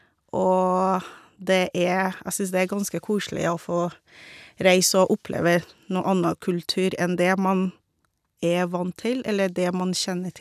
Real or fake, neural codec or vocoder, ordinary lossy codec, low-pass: real; none; none; 14.4 kHz